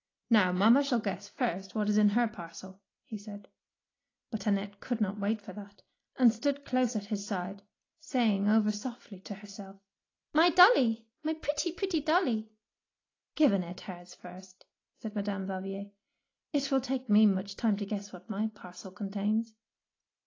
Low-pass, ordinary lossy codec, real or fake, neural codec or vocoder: 7.2 kHz; AAC, 32 kbps; real; none